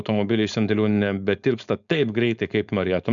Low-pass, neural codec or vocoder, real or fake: 7.2 kHz; codec, 16 kHz, 4.8 kbps, FACodec; fake